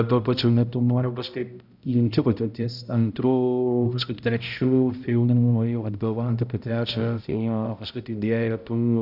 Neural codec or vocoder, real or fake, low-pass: codec, 16 kHz, 0.5 kbps, X-Codec, HuBERT features, trained on balanced general audio; fake; 5.4 kHz